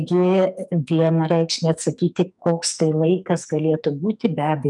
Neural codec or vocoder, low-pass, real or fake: codec, 44.1 kHz, 2.6 kbps, SNAC; 10.8 kHz; fake